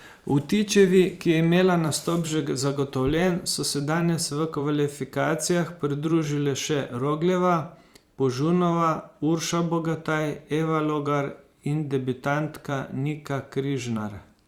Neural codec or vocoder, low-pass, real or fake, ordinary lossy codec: vocoder, 44.1 kHz, 128 mel bands every 512 samples, BigVGAN v2; 19.8 kHz; fake; Opus, 64 kbps